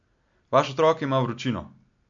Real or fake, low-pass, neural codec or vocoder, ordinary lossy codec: real; 7.2 kHz; none; MP3, 48 kbps